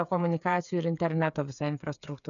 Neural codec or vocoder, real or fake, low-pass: codec, 16 kHz, 8 kbps, FreqCodec, smaller model; fake; 7.2 kHz